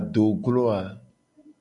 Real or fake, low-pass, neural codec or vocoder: real; 10.8 kHz; none